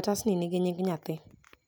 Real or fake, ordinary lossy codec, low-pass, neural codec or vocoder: real; none; none; none